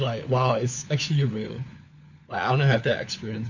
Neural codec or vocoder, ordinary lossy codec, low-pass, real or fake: codec, 16 kHz, 4 kbps, FunCodec, trained on LibriTTS, 50 frames a second; none; 7.2 kHz; fake